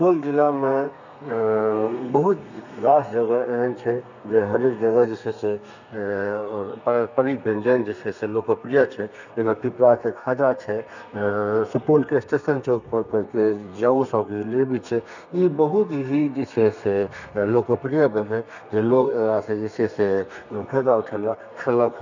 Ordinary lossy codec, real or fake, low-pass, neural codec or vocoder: none; fake; 7.2 kHz; codec, 32 kHz, 1.9 kbps, SNAC